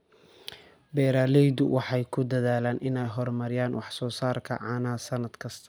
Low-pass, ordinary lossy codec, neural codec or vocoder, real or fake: none; none; none; real